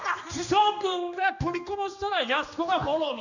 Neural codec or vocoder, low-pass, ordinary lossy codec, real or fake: codec, 16 kHz, 2 kbps, X-Codec, HuBERT features, trained on general audio; 7.2 kHz; none; fake